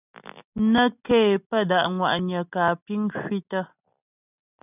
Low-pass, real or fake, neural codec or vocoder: 3.6 kHz; real; none